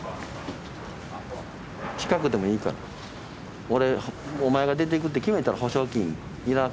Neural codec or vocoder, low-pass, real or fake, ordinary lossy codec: none; none; real; none